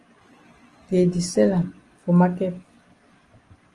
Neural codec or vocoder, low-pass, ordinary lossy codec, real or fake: none; 10.8 kHz; Opus, 24 kbps; real